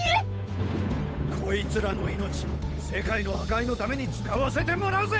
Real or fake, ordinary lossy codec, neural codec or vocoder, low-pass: fake; none; codec, 16 kHz, 8 kbps, FunCodec, trained on Chinese and English, 25 frames a second; none